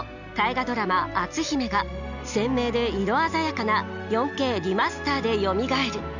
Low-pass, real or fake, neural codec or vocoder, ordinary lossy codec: 7.2 kHz; real; none; none